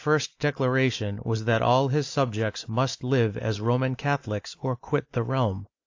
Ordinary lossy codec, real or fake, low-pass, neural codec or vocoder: AAC, 48 kbps; real; 7.2 kHz; none